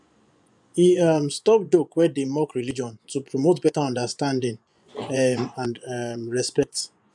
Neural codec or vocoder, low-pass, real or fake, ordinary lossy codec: vocoder, 24 kHz, 100 mel bands, Vocos; 10.8 kHz; fake; none